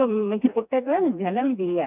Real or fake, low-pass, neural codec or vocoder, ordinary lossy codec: fake; 3.6 kHz; codec, 16 kHz, 2 kbps, FreqCodec, smaller model; none